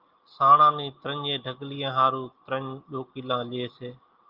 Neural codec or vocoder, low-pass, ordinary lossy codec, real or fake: none; 5.4 kHz; Opus, 32 kbps; real